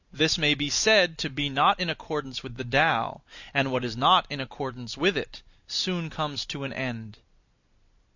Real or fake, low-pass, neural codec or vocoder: real; 7.2 kHz; none